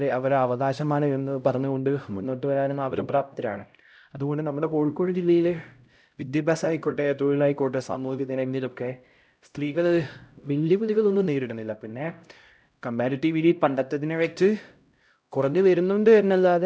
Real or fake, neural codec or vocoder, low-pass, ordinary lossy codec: fake; codec, 16 kHz, 0.5 kbps, X-Codec, HuBERT features, trained on LibriSpeech; none; none